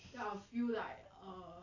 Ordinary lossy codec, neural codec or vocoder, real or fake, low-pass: AAC, 32 kbps; none; real; 7.2 kHz